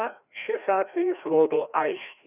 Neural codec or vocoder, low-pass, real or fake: codec, 16 kHz, 1 kbps, FreqCodec, larger model; 3.6 kHz; fake